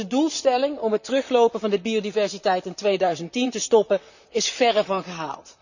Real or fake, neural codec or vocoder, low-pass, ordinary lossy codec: fake; vocoder, 44.1 kHz, 128 mel bands, Pupu-Vocoder; 7.2 kHz; none